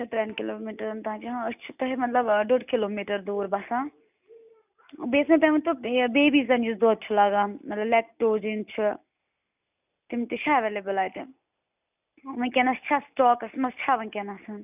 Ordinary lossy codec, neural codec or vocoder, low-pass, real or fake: none; none; 3.6 kHz; real